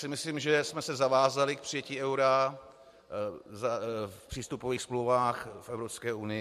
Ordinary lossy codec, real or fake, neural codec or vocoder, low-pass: MP3, 64 kbps; real; none; 14.4 kHz